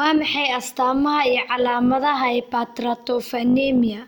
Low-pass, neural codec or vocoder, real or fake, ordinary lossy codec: 19.8 kHz; none; real; none